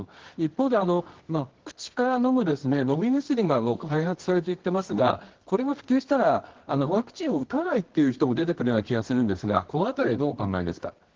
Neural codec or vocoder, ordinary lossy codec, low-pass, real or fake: codec, 24 kHz, 0.9 kbps, WavTokenizer, medium music audio release; Opus, 16 kbps; 7.2 kHz; fake